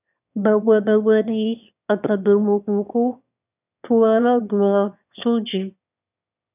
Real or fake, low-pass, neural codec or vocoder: fake; 3.6 kHz; autoencoder, 22.05 kHz, a latent of 192 numbers a frame, VITS, trained on one speaker